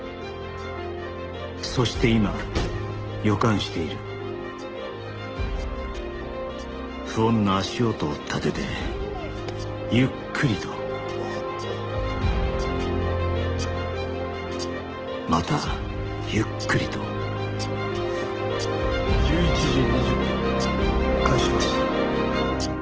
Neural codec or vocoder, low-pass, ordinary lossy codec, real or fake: none; 7.2 kHz; Opus, 16 kbps; real